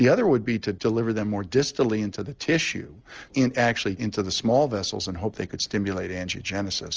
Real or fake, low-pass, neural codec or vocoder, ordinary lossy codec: real; 7.2 kHz; none; Opus, 24 kbps